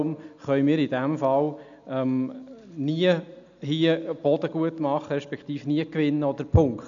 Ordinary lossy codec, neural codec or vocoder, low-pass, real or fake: none; none; 7.2 kHz; real